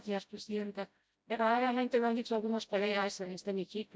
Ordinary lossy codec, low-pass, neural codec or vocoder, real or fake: none; none; codec, 16 kHz, 0.5 kbps, FreqCodec, smaller model; fake